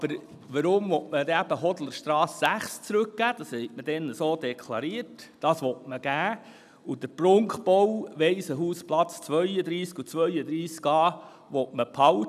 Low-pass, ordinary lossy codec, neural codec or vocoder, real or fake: 14.4 kHz; none; none; real